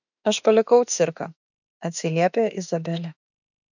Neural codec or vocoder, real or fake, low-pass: autoencoder, 48 kHz, 32 numbers a frame, DAC-VAE, trained on Japanese speech; fake; 7.2 kHz